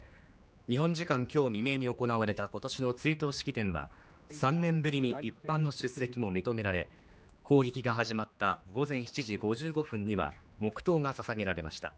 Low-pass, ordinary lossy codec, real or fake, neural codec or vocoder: none; none; fake; codec, 16 kHz, 2 kbps, X-Codec, HuBERT features, trained on general audio